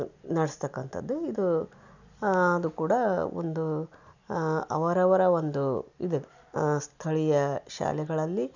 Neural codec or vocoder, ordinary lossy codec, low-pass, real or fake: none; none; 7.2 kHz; real